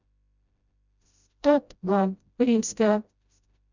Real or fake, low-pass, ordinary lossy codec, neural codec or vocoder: fake; 7.2 kHz; none; codec, 16 kHz, 0.5 kbps, FreqCodec, smaller model